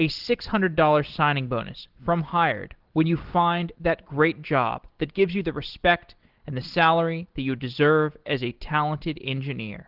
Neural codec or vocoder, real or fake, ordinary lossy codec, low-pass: none; real; Opus, 16 kbps; 5.4 kHz